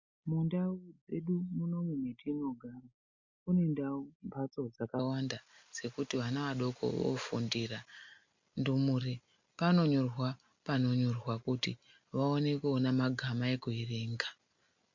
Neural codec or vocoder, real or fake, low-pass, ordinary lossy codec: none; real; 7.2 kHz; MP3, 64 kbps